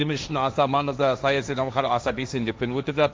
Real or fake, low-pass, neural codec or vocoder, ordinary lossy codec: fake; none; codec, 16 kHz, 1.1 kbps, Voila-Tokenizer; none